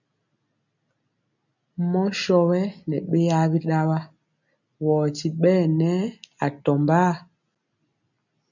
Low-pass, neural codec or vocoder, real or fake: 7.2 kHz; none; real